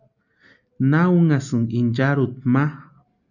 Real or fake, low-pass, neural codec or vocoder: real; 7.2 kHz; none